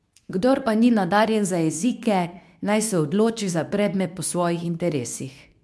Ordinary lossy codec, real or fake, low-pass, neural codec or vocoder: none; fake; none; codec, 24 kHz, 0.9 kbps, WavTokenizer, medium speech release version 2